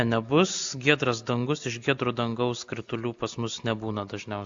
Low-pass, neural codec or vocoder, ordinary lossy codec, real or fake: 7.2 kHz; none; AAC, 64 kbps; real